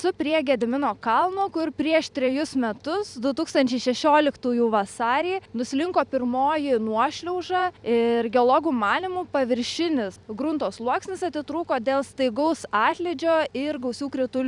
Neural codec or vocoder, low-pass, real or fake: vocoder, 44.1 kHz, 128 mel bands every 256 samples, BigVGAN v2; 10.8 kHz; fake